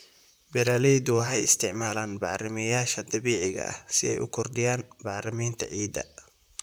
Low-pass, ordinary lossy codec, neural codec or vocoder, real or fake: none; none; vocoder, 44.1 kHz, 128 mel bands, Pupu-Vocoder; fake